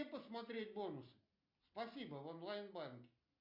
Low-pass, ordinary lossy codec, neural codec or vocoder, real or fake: 5.4 kHz; MP3, 48 kbps; none; real